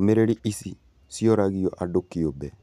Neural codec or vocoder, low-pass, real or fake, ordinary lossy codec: none; 14.4 kHz; real; none